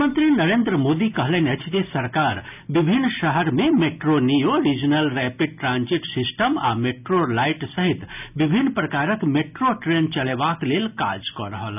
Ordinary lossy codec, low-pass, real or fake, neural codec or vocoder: none; 3.6 kHz; real; none